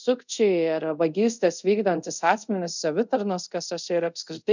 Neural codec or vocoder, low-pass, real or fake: codec, 24 kHz, 0.5 kbps, DualCodec; 7.2 kHz; fake